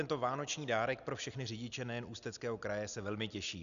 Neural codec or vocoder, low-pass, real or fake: none; 7.2 kHz; real